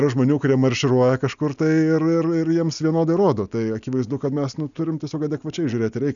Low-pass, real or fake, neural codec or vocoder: 7.2 kHz; real; none